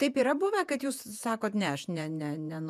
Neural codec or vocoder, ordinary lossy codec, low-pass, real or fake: vocoder, 48 kHz, 128 mel bands, Vocos; MP3, 96 kbps; 14.4 kHz; fake